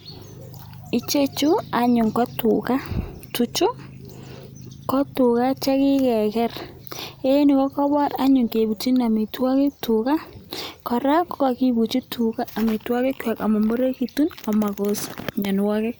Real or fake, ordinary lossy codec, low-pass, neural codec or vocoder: real; none; none; none